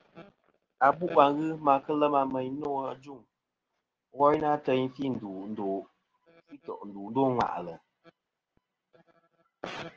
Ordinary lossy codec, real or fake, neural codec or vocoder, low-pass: Opus, 16 kbps; real; none; 7.2 kHz